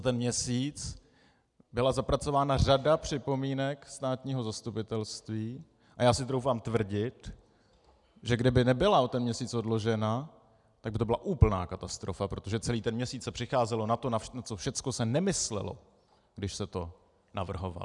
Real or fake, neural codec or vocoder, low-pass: real; none; 10.8 kHz